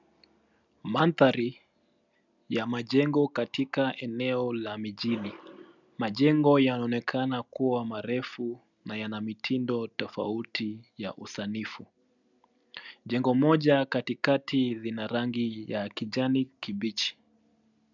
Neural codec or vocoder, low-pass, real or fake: none; 7.2 kHz; real